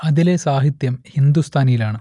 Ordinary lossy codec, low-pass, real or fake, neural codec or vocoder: none; 10.8 kHz; real; none